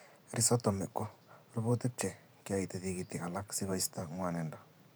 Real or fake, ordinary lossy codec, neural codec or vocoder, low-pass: fake; none; vocoder, 44.1 kHz, 128 mel bands every 512 samples, BigVGAN v2; none